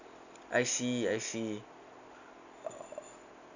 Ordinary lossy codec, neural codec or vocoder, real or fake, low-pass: none; none; real; 7.2 kHz